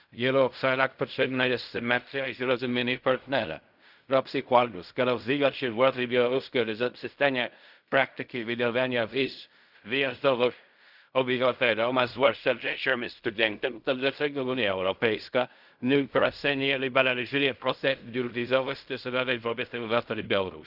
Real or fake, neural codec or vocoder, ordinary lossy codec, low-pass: fake; codec, 16 kHz in and 24 kHz out, 0.4 kbps, LongCat-Audio-Codec, fine tuned four codebook decoder; none; 5.4 kHz